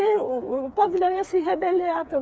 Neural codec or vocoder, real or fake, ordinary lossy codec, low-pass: codec, 16 kHz, 4 kbps, FreqCodec, smaller model; fake; none; none